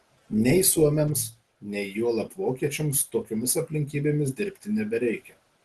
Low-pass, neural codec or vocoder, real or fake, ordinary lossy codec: 10.8 kHz; none; real; Opus, 16 kbps